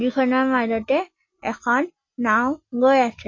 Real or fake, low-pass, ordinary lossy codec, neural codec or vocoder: real; 7.2 kHz; MP3, 32 kbps; none